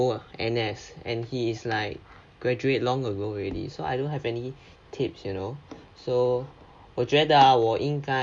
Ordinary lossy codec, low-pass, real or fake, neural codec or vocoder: none; 9.9 kHz; real; none